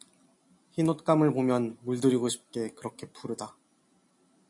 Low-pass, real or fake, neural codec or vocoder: 10.8 kHz; real; none